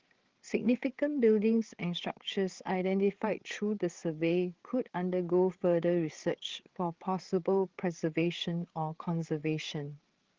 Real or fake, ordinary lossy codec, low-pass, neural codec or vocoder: fake; Opus, 16 kbps; 7.2 kHz; codec, 16 kHz, 8 kbps, FreqCodec, larger model